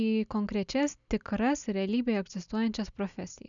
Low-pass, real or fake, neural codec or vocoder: 7.2 kHz; real; none